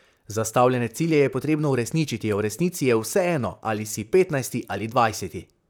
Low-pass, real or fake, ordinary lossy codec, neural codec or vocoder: none; fake; none; vocoder, 44.1 kHz, 128 mel bands, Pupu-Vocoder